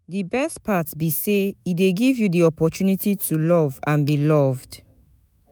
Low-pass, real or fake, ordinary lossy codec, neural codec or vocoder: none; fake; none; autoencoder, 48 kHz, 128 numbers a frame, DAC-VAE, trained on Japanese speech